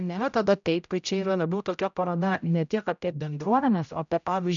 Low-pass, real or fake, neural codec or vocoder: 7.2 kHz; fake; codec, 16 kHz, 0.5 kbps, X-Codec, HuBERT features, trained on general audio